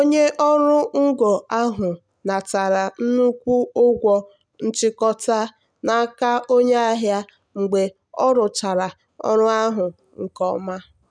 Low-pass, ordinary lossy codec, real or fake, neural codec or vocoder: 9.9 kHz; none; real; none